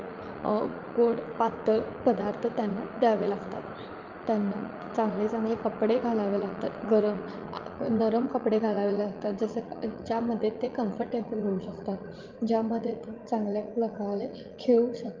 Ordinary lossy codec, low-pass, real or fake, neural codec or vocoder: Opus, 32 kbps; 7.2 kHz; fake; vocoder, 44.1 kHz, 80 mel bands, Vocos